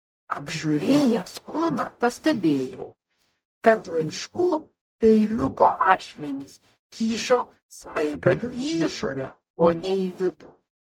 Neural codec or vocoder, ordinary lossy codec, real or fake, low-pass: codec, 44.1 kHz, 0.9 kbps, DAC; MP3, 96 kbps; fake; 19.8 kHz